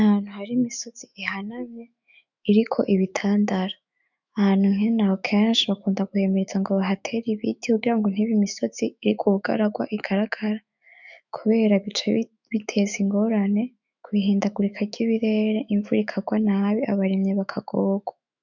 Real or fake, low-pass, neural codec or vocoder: fake; 7.2 kHz; autoencoder, 48 kHz, 128 numbers a frame, DAC-VAE, trained on Japanese speech